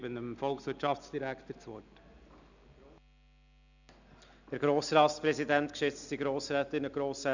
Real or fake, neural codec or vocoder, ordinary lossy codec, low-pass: real; none; none; 7.2 kHz